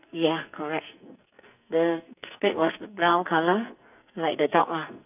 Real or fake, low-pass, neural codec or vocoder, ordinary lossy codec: fake; 3.6 kHz; codec, 44.1 kHz, 2.6 kbps, SNAC; none